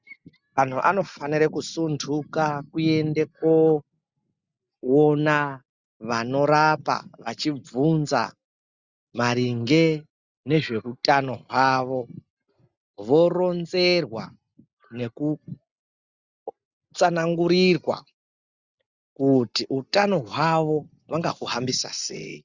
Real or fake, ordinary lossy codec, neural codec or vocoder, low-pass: real; Opus, 64 kbps; none; 7.2 kHz